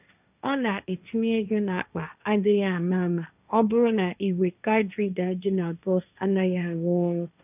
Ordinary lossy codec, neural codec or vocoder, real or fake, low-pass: none; codec, 16 kHz, 1.1 kbps, Voila-Tokenizer; fake; 3.6 kHz